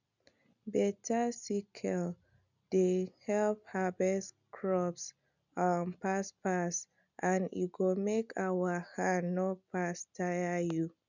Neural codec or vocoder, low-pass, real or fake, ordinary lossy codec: none; 7.2 kHz; real; none